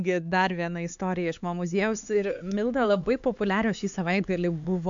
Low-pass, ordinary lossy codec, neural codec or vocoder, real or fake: 7.2 kHz; MP3, 64 kbps; codec, 16 kHz, 4 kbps, X-Codec, HuBERT features, trained on LibriSpeech; fake